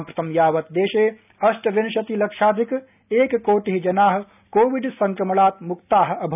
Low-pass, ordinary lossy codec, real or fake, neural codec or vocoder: 3.6 kHz; none; real; none